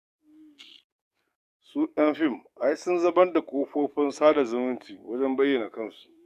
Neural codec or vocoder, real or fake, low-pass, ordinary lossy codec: codec, 44.1 kHz, 7.8 kbps, DAC; fake; 14.4 kHz; none